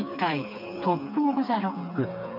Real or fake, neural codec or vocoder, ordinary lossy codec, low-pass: fake; codec, 16 kHz, 4 kbps, FreqCodec, smaller model; none; 5.4 kHz